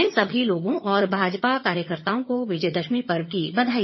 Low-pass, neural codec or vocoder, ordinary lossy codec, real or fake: 7.2 kHz; vocoder, 22.05 kHz, 80 mel bands, HiFi-GAN; MP3, 24 kbps; fake